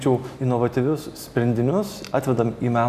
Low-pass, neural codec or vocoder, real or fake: 14.4 kHz; none; real